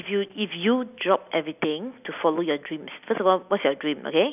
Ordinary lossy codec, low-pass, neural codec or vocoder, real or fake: none; 3.6 kHz; none; real